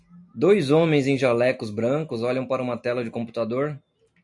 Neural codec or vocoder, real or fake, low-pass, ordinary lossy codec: none; real; 10.8 kHz; MP3, 64 kbps